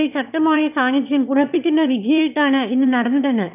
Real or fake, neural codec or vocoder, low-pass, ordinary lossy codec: fake; autoencoder, 22.05 kHz, a latent of 192 numbers a frame, VITS, trained on one speaker; 3.6 kHz; none